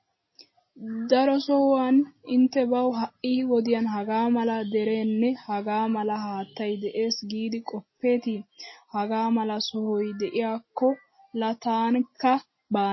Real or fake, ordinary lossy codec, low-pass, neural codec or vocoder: real; MP3, 24 kbps; 7.2 kHz; none